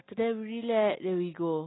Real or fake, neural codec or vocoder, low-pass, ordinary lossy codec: real; none; 7.2 kHz; AAC, 16 kbps